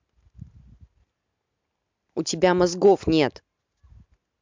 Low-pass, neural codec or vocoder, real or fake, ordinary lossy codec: 7.2 kHz; none; real; MP3, 64 kbps